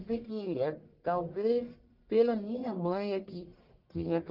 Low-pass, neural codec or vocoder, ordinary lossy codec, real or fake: 5.4 kHz; codec, 44.1 kHz, 1.7 kbps, Pupu-Codec; Opus, 24 kbps; fake